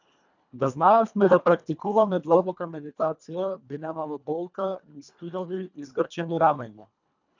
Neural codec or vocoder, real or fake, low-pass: codec, 24 kHz, 1.5 kbps, HILCodec; fake; 7.2 kHz